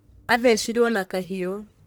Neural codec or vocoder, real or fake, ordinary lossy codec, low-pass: codec, 44.1 kHz, 1.7 kbps, Pupu-Codec; fake; none; none